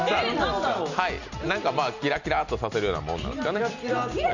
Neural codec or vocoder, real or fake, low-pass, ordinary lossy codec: none; real; 7.2 kHz; none